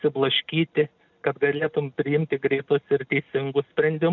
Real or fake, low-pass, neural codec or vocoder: real; 7.2 kHz; none